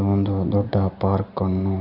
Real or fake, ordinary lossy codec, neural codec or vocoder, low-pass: fake; none; vocoder, 44.1 kHz, 128 mel bands every 256 samples, BigVGAN v2; 5.4 kHz